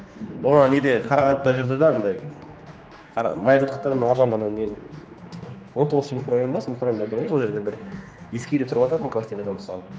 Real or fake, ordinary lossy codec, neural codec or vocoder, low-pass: fake; none; codec, 16 kHz, 2 kbps, X-Codec, HuBERT features, trained on general audio; none